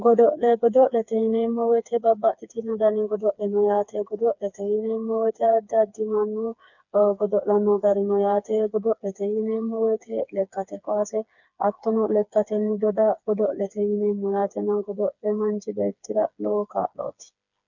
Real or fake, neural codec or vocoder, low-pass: fake; codec, 16 kHz, 4 kbps, FreqCodec, smaller model; 7.2 kHz